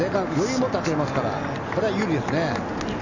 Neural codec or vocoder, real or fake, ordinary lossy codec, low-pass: none; real; none; 7.2 kHz